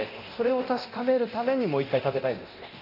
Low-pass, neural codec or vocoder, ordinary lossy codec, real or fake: 5.4 kHz; codec, 24 kHz, 0.9 kbps, DualCodec; none; fake